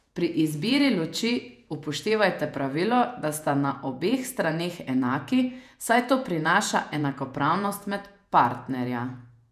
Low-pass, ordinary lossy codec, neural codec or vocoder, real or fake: 14.4 kHz; none; vocoder, 48 kHz, 128 mel bands, Vocos; fake